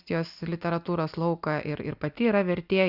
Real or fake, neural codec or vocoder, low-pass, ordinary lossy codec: real; none; 5.4 kHz; Opus, 64 kbps